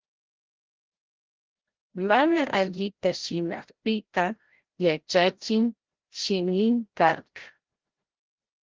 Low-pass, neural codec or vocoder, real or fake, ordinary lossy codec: 7.2 kHz; codec, 16 kHz, 0.5 kbps, FreqCodec, larger model; fake; Opus, 16 kbps